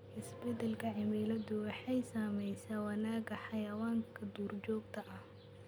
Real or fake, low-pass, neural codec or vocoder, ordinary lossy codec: real; none; none; none